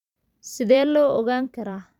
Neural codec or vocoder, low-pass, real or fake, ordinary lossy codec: vocoder, 44.1 kHz, 128 mel bands every 512 samples, BigVGAN v2; 19.8 kHz; fake; none